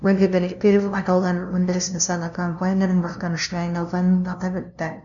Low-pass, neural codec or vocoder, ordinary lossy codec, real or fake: 7.2 kHz; codec, 16 kHz, 0.5 kbps, FunCodec, trained on LibriTTS, 25 frames a second; none; fake